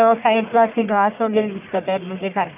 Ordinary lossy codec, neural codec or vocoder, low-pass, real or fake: none; codec, 44.1 kHz, 1.7 kbps, Pupu-Codec; 3.6 kHz; fake